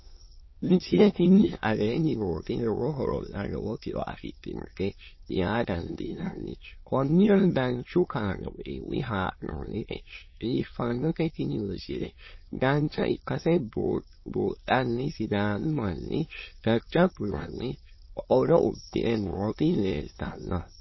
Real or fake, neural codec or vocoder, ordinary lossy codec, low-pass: fake; autoencoder, 22.05 kHz, a latent of 192 numbers a frame, VITS, trained on many speakers; MP3, 24 kbps; 7.2 kHz